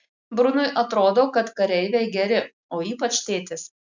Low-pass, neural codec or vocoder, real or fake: 7.2 kHz; none; real